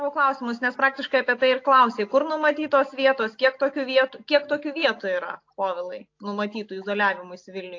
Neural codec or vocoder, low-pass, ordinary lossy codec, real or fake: none; 7.2 kHz; AAC, 48 kbps; real